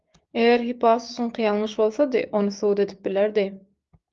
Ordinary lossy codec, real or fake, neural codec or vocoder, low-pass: Opus, 32 kbps; fake; codec, 16 kHz, 6 kbps, DAC; 7.2 kHz